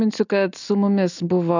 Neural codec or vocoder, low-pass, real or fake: none; 7.2 kHz; real